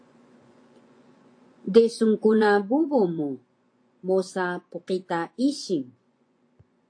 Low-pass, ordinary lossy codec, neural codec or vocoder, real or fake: 9.9 kHz; AAC, 48 kbps; vocoder, 24 kHz, 100 mel bands, Vocos; fake